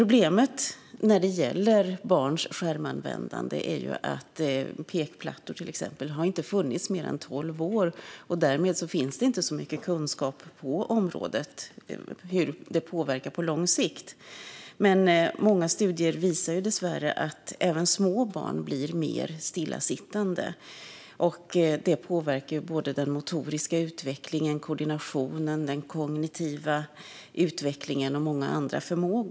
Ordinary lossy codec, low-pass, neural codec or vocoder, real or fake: none; none; none; real